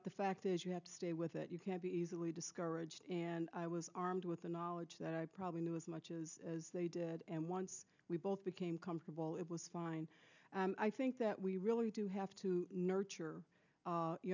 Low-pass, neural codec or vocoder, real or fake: 7.2 kHz; none; real